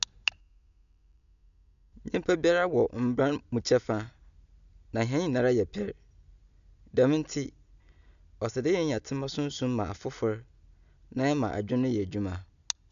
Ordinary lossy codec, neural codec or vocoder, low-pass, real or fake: none; none; 7.2 kHz; real